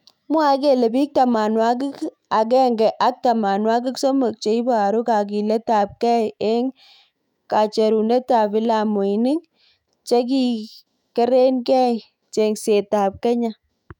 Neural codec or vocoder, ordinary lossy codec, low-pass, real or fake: autoencoder, 48 kHz, 128 numbers a frame, DAC-VAE, trained on Japanese speech; none; 19.8 kHz; fake